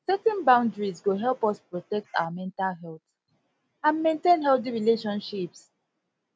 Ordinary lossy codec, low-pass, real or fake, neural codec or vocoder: none; none; real; none